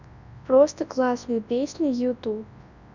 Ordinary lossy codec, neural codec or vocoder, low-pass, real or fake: none; codec, 24 kHz, 0.9 kbps, WavTokenizer, large speech release; 7.2 kHz; fake